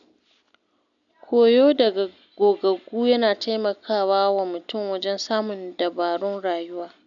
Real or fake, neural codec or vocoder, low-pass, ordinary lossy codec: real; none; 7.2 kHz; none